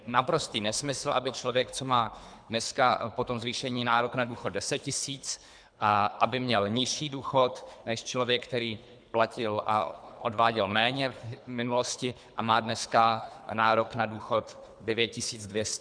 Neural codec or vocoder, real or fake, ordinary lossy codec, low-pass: codec, 24 kHz, 3 kbps, HILCodec; fake; MP3, 96 kbps; 9.9 kHz